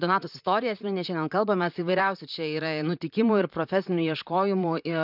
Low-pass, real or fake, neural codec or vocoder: 5.4 kHz; fake; vocoder, 44.1 kHz, 128 mel bands, Pupu-Vocoder